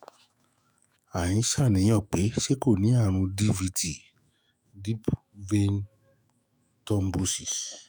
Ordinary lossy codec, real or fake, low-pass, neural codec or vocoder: none; fake; none; autoencoder, 48 kHz, 128 numbers a frame, DAC-VAE, trained on Japanese speech